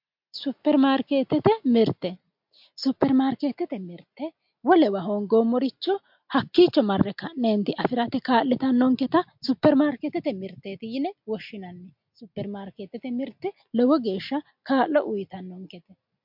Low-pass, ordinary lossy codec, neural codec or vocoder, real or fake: 5.4 kHz; MP3, 48 kbps; none; real